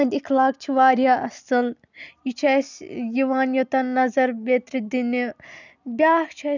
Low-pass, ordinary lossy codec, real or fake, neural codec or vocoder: 7.2 kHz; none; real; none